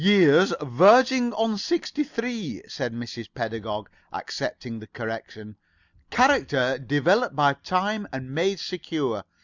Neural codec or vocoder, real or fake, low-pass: none; real; 7.2 kHz